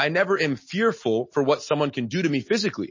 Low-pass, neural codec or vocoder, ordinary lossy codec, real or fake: 7.2 kHz; none; MP3, 32 kbps; real